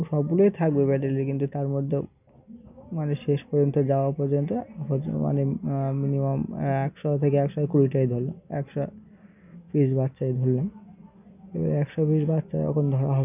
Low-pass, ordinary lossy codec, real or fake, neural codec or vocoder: 3.6 kHz; none; real; none